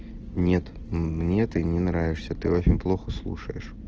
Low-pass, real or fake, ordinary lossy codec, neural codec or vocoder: 7.2 kHz; real; Opus, 16 kbps; none